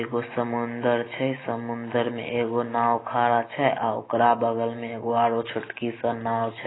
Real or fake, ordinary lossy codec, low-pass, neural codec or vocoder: real; AAC, 16 kbps; 7.2 kHz; none